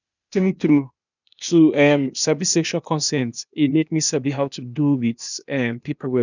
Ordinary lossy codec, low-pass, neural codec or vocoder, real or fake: none; 7.2 kHz; codec, 16 kHz, 0.8 kbps, ZipCodec; fake